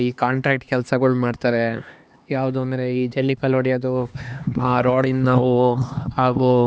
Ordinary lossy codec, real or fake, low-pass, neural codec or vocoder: none; fake; none; codec, 16 kHz, 2 kbps, X-Codec, HuBERT features, trained on LibriSpeech